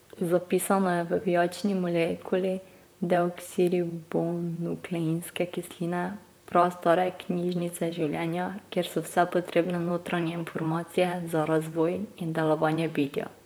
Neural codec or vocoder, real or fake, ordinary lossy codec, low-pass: vocoder, 44.1 kHz, 128 mel bands, Pupu-Vocoder; fake; none; none